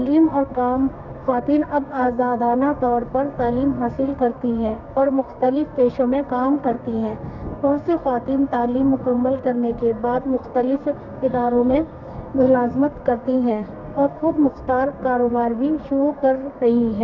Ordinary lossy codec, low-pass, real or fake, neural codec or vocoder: none; 7.2 kHz; fake; codec, 32 kHz, 1.9 kbps, SNAC